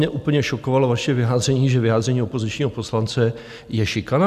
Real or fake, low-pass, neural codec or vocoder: real; 14.4 kHz; none